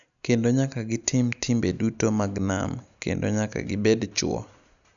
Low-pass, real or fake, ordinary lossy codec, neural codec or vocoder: 7.2 kHz; real; none; none